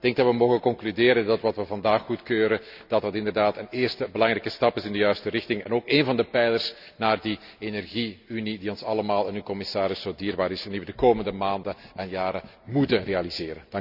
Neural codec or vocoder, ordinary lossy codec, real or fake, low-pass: none; none; real; 5.4 kHz